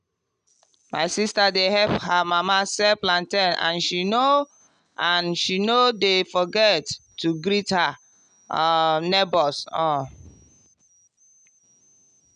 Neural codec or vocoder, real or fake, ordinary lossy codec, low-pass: none; real; MP3, 96 kbps; 9.9 kHz